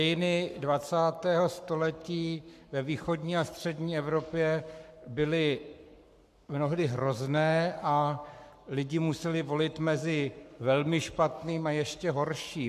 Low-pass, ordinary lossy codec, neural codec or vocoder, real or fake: 14.4 kHz; AAC, 96 kbps; codec, 44.1 kHz, 7.8 kbps, Pupu-Codec; fake